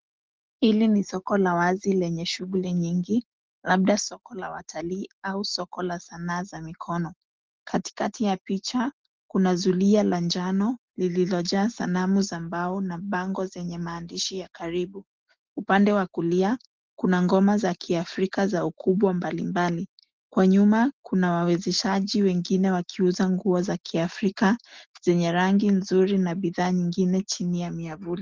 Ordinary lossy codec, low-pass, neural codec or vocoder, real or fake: Opus, 16 kbps; 7.2 kHz; none; real